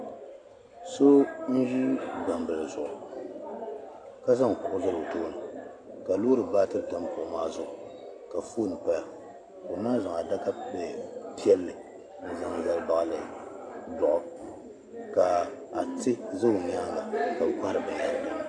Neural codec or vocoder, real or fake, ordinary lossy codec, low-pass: none; real; AAC, 48 kbps; 9.9 kHz